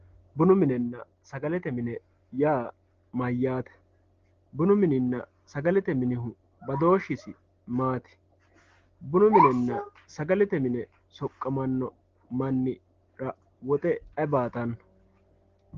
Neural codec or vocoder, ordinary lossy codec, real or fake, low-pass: none; Opus, 16 kbps; real; 7.2 kHz